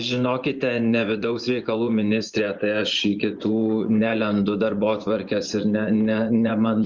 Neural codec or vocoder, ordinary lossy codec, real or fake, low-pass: vocoder, 24 kHz, 100 mel bands, Vocos; Opus, 32 kbps; fake; 7.2 kHz